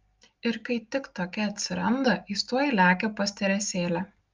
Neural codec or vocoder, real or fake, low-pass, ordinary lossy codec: none; real; 7.2 kHz; Opus, 32 kbps